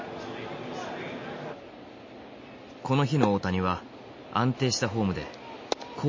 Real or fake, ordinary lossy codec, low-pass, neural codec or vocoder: real; MP3, 32 kbps; 7.2 kHz; none